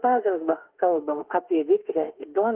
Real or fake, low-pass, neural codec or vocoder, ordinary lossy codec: fake; 3.6 kHz; autoencoder, 48 kHz, 32 numbers a frame, DAC-VAE, trained on Japanese speech; Opus, 16 kbps